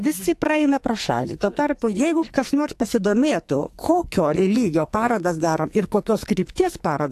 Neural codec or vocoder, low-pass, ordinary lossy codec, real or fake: codec, 44.1 kHz, 2.6 kbps, SNAC; 14.4 kHz; AAC, 64 kbps; fake